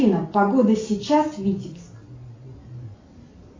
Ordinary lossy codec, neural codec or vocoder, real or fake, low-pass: AAC, 32 kbps; none; real; 7.2 kHz